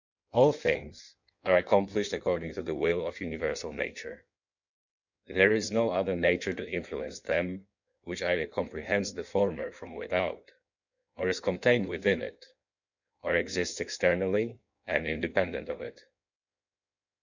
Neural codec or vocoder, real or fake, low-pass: codec, 16 kHz in and 24 kHz out, 1.1 kbps, FireRedTTS-2 codec; fake; 7.2 kHz